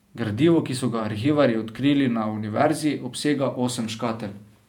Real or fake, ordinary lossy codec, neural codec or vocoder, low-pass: fake; none; vocoder, 48 kHz, 128 mel bands, Vocos; 19.8 kHz